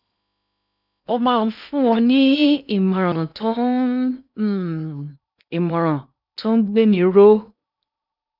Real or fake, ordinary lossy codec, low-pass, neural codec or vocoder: fake; none; 5.4 kHz; codec, 16 kHz in and 24 kHz out, 0.8 kbps, FocalCodec, streaming, 65536 codes